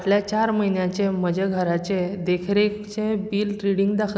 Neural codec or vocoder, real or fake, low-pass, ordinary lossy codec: none; real; none; none